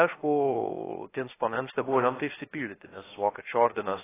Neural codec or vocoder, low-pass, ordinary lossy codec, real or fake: codec, 16 kHz, 0.3 kbps, FocalCodec; 3.6 kHz; AAC, 16 kbps; fake